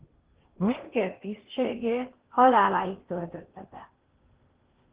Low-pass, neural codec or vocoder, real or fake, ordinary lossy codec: 3.6 kHz; codec, 16 kHz in and 24 kHz out, 0.8 kbps, FocalCodec, streaming, 65536 codes; fake; Opus, 16 kbps